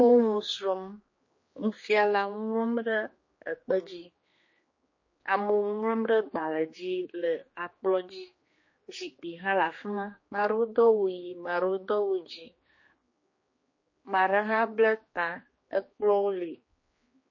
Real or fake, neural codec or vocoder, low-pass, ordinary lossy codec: fake; codec, 16 kHz, 2 kbps, X-Codec, HuBERT features, trained on general audio; 7.2 kHz; MP3, 32 kbps